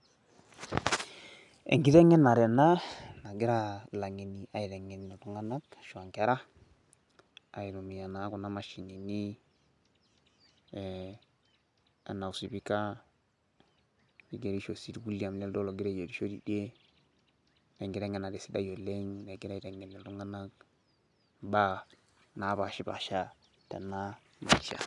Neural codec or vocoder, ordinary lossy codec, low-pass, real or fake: none; none; 10.8 kHz; real